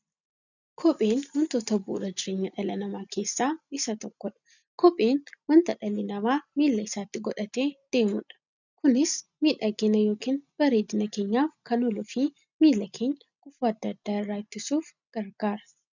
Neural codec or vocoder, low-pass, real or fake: none; 7.2 kHz; real